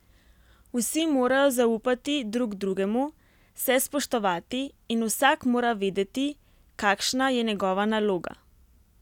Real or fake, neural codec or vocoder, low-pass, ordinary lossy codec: real; none; 19.8 kHz; none